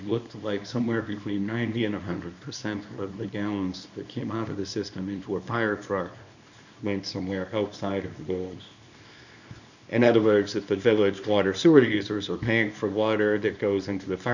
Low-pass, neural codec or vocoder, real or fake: 7.2 kHz; codec, 24 kHz, 0.9 kbps, WavTokenizer, small release; fake